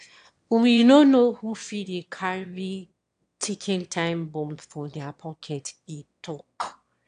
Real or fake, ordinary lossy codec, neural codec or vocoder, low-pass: fake; AAC, 64 kbps; autoencoder, 22.05 kHz, a latent of 192 numbers a frame, VITS, trained on one speaker; 9.9 kHz